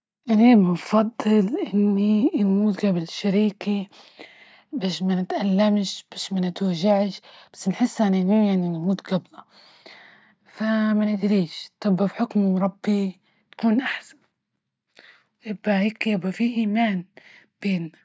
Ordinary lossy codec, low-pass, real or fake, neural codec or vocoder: none; none; real; none